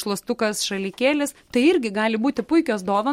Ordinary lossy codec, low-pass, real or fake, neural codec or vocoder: MP3, 64 kbps; 19.8 kHz; real; none